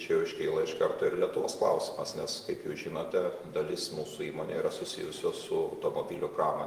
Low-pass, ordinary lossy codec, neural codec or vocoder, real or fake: 14.4 kHz; Opus, 24 kbps; vocoder, 48 kHz, 128 mel bands, Vocos; fake